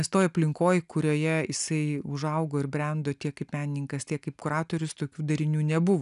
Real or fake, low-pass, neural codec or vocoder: real; 10.8 kHz; none